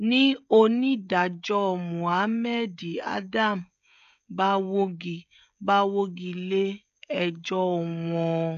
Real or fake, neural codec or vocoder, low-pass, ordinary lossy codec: fake; codec, 16 kHz, 16 kbps, FreqCodec, smaller model; 7.2 kHz; MP3, 64 kbps